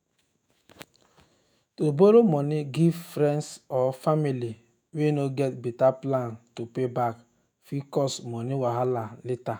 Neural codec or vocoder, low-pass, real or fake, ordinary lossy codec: autoencoder, 48 kHz, 128 numbers a frame, DAC-VAE, trained on Japanese speech; none; fake; none